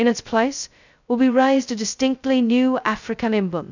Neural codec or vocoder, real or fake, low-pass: codec, 16 kHz, 0.2 kbps, FocalCodec; fake; 7.2 kHz